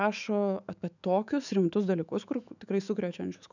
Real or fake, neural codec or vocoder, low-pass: fake; autoencoder, 48 kHz, 128 numbers a frame, DAC-VAE, trained on Japanese speech; 7.2 kHz